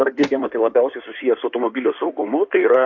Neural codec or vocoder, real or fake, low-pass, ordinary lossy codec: codec, 16 kHz in and 24 kHz out, 2.2 kbps, FireRedTTS-2 codec; fake; 7.2 kHz; AAC, 48 kbps